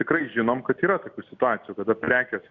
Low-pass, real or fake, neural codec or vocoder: 7.2 kHz; real; none